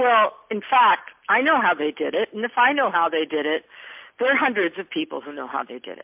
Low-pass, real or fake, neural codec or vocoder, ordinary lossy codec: 3.6 kHz; real; none; MP3, 32 kbps